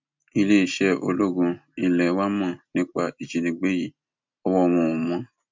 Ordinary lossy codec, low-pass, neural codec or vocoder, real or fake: MP3, 64 kbps; 7.2 kHz; none; real